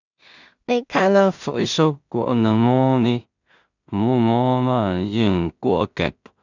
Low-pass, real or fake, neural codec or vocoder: 7.2 kHz; fake; codec, 16 kHz in and 24 kHz out, 0.4 kbps, LongCat-Audio-Codec, two codebook decoder